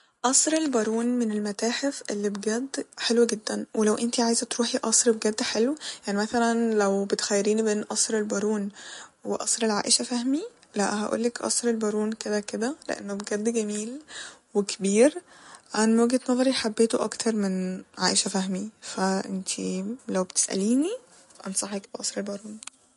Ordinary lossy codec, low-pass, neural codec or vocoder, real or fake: MP3, 48 kbps; 14.4 kHz; none; real